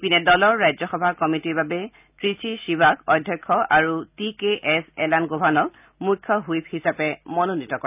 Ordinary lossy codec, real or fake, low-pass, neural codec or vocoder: none; real; 3.6 kHz; none